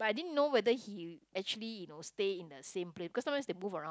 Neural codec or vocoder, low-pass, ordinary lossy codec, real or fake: none; none; none; real